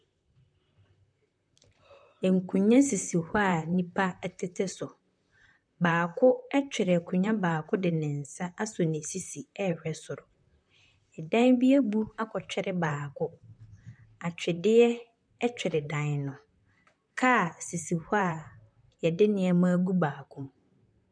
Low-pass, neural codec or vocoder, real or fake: 9.9 kHz; vocoder, 44.1 kHz, 128 mel bands, Pupu-Vocoder; fake